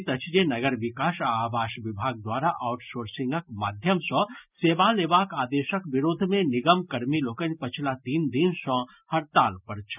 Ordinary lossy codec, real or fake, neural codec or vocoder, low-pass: none; real; none; 3.6 kHz